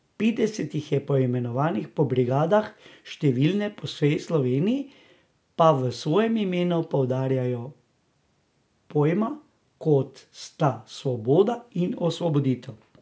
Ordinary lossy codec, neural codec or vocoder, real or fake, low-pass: none; none; real; none